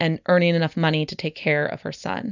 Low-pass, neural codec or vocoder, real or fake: 7.2 kHz; none; real